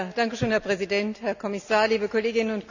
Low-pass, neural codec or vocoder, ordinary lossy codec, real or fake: 7.2 kHz; none; none; real